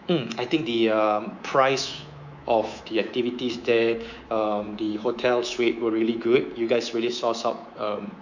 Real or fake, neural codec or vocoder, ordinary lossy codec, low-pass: fake; codec, 24 kHz, 3.1 kbps, DualCodec; none; 7.2 kHz